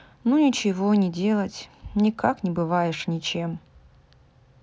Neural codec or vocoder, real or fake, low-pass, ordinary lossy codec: none; real; none; none